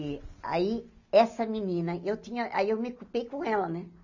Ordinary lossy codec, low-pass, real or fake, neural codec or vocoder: none; 7.2 kHz; real; none